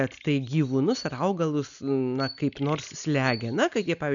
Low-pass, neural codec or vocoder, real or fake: 7.2 kHz; none; real